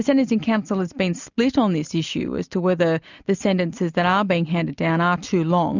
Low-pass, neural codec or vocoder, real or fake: 7.2 kHz; none; real